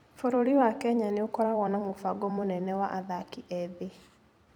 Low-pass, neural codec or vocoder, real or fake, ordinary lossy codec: 19.8 kHz; vocoder, 44.1 kHz, 128 mel bands every 256 samples, BigVGAN v2; fake; none